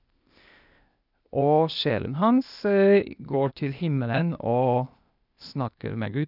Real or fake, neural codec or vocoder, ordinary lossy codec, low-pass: fake; codec, 16 kHz, 0.8 kbps, ZipCodec; none; 5.4 kHz